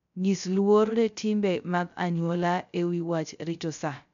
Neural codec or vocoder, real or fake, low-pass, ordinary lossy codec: codec, 16 kHz, 0.3 kbps, FocalCodec; fake; 7.2 kHz; none